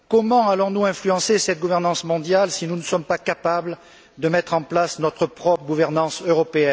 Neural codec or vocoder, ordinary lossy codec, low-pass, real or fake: none; none; none; real